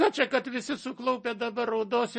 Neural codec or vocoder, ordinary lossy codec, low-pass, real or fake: none; MP3, 32 kbps; 10.8 kHz; real